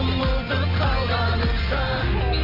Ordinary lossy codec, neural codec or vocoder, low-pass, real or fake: none; none; 5.4 kHz; real